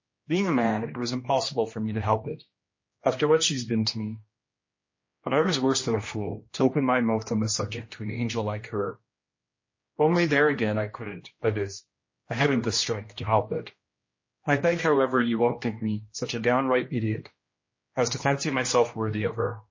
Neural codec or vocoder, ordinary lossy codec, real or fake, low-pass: codec, 16 kHz, 1 kbps, X-Codec, HuBERT features, trained on general audio; MP3, 32 kbps; fake; 7.2 kHz